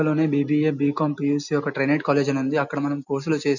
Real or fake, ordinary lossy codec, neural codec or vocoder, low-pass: real; none; none; 7.2 kHz